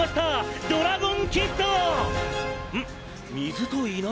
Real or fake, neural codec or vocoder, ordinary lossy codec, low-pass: real; none; none; none